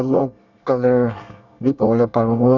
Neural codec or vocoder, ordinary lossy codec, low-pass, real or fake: codec, 24 kHz, 1 kbps, SNAC; none; 7.2 kHz; fake